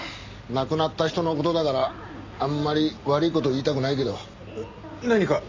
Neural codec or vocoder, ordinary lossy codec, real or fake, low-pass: none; MP3, 64 kbps; real; 7.2 kHz